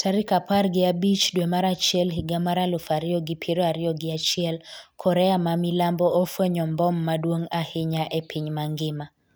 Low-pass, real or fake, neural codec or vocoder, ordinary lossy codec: none; real; none; none